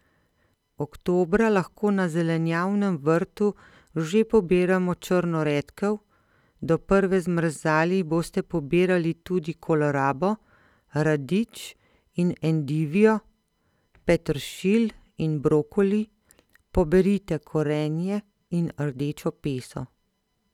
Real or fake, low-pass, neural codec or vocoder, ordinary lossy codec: real; 19.8 kHz; none; none